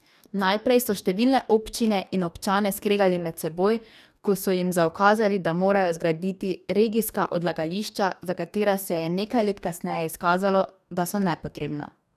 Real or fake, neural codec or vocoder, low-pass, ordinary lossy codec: fake; codec, 44.1 kHz, 2.6 kbps, DAC; 14.4 kHz; none